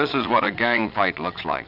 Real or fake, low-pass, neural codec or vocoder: fake; 5.4 kHz; vocoder, 22.05 kHz, 80 mel bands, Vocos